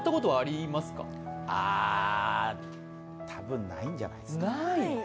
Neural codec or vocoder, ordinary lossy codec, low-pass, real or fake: none; none; none; real